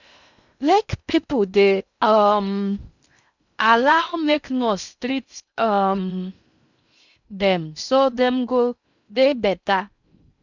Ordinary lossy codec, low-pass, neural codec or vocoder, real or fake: none; 7.2 kHz; codec, 16 kHz in and 24 kHz out, 0.6 kbps, FocalCodec, streaming, 2048 codes; fake